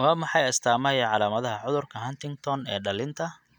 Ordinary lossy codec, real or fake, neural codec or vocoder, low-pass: none; real; none; 19.8 kHz